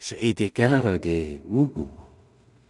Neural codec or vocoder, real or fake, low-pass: codec, 16 kHz in and 24 kHz out, 0.4 kbps, LongCat-Audio-Codec, two codebook decoder; fake; 10.8 kHz